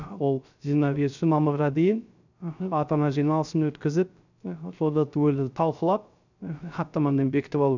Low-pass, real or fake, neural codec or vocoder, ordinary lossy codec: 7.2 kHz; fake; codec, 16 kHz, 0.3 kbps, FocalCodec; none